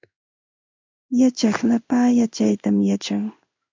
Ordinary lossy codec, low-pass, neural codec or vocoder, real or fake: MP3, 64 kbps; 7.2 kHz; codec, 16 kHz in and 24 kHz out, 1 kbps, XY-Tokenizer; fake